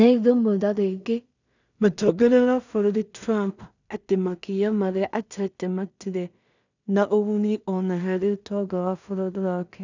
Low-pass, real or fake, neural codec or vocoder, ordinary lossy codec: 7.2 kHz; fake; codec, 16 kHz in and 24 kHz out, 0.4 kbps, LongCat-Audio-Codec, two codebook decoder; none